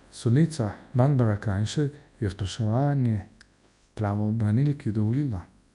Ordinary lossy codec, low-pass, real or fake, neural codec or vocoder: none; 10.8 kHz; fake; codec, 24 kHz, 0.9 kbps, WavTokenizer, large speech release